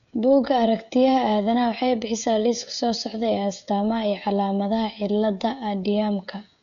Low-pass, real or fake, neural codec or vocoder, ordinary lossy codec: 7.2 kHz; fake; codec, 16 kHz, 16 kbps, FreqCodec, smaller model; none